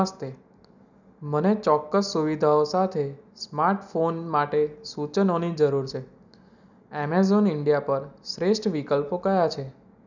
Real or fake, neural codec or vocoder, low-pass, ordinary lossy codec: real; none; 7.2 kHz; none